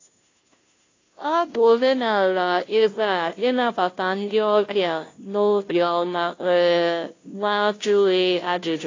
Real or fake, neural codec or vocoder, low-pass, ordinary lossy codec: fake; codec, 16 kHz, 0.5 kbps, FunCodec, trained on Chinese and English, 25 frames a second; 7.2 kHz; AAC, 32 kbps